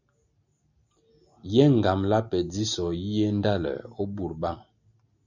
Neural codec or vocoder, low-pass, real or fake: none; 7.2 kHz; real